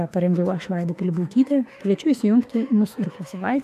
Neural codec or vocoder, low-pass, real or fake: autoencoder, 48 kHz, 32 numbers a frame, DAC-VAE, trained on Japanese speech; 14.4 kHz; fake